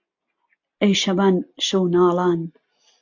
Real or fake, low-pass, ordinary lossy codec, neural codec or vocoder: real; 7.2 kHz; AAC, 48 kbps; none